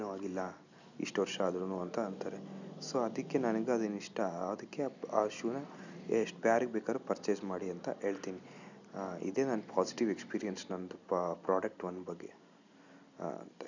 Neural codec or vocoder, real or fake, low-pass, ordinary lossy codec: none; real; 7.2 kHz; none